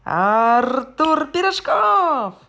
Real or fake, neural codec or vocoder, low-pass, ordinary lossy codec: real; none; none; none